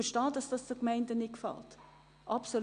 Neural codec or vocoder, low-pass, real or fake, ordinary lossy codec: none; 9.9 kHz; real; Opus, 64 kbps